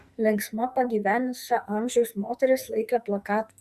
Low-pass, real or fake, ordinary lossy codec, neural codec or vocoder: 14.4 kHz; fake; Opus, 64 kbps; codec, 44.1 kHz, 2.6 kbps, SNAC